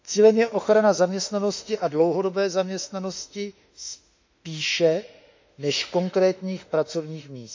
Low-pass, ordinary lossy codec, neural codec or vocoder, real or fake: 7.2 kHz; MP3, 64 kbps; autoencoder, 48 kHz, 32 numbers a frame, DAC-VAE, trained on Japanese speech; fake